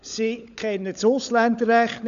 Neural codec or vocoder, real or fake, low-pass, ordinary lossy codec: codec, 16 kHz, 16 kbps, FunCodec, trained on LibriTTS, 50 frames a second; fake; 7.2 kHz; none